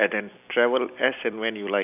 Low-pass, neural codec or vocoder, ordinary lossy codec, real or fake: 3.6 kHz; none; none; real